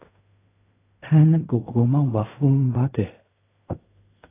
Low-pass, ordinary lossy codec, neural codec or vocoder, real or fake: 3.6 kHz; AAC, 24 kbps; codec, 16 kHz in and 24 kHz out, 0.4 kbps, LongCat-Audio-Codec, fine tuned four codebook decoder; fake